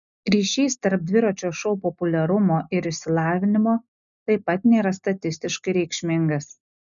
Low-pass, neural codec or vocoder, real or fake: 7.2 kHz; none; real